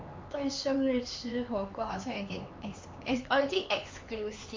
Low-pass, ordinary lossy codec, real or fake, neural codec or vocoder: 7.2 kHz; MP3, 48 kbps; fake; codec, 16 kHz, 4 kbps, X-Codec, HuBERT features, trained on LibriSpeech